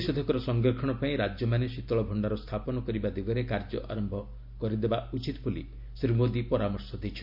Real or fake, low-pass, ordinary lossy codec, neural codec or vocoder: real; 5.4 kHz; MP3, 48 kbps; none